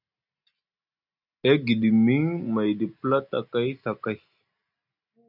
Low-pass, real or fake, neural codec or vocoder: 5.4 kHz; real; none